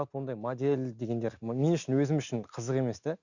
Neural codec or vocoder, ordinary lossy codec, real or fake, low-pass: none; none; real; 7.2 kHz